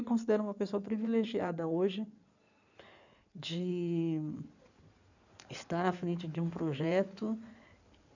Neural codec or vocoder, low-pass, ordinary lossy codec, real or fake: codec, 16 kHz in and 24 kHz out, 2.2 kbps, FireRedTTS-2 codec; 7.2 kHz; none; fake